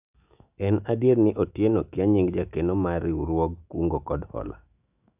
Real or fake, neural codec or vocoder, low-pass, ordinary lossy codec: fake; autoencoder, 48 kHz, 128 numbers a frame, DAC-VAE, trained on Japanese speech; 3.6 kHz; AAC, 32 kbps